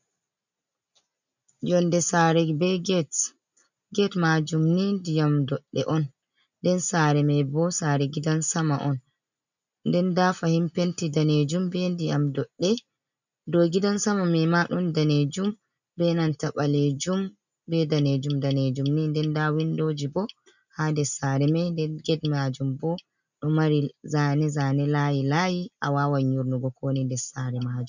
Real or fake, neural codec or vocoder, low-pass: real; none; 7.2 kHz